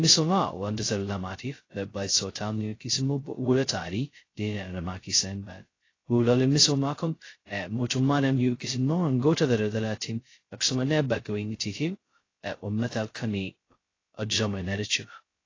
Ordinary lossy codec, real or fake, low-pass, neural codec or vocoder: AAC, 32 kbps; fake; 7.2 kHz; codec, 16 kHz, 0.2 kbps, FocalCodec